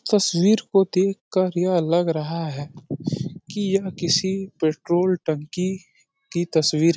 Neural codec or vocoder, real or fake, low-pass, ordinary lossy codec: none; real; none; none